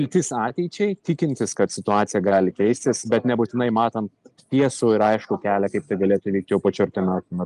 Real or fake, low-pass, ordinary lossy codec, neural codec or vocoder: real; 10.8 kHz; Opus, 32 kbps; none